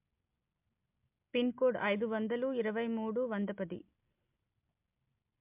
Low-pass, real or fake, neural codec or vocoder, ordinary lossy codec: 3.6 kHz; real; none; MP3, 32 kbps